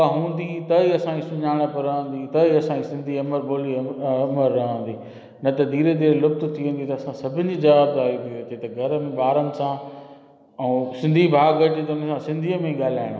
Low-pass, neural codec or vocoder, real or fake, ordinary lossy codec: none; none; real; none